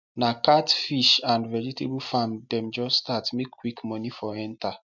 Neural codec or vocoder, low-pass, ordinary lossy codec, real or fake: none; 7.2 kHz; MP3, 64 kbps; real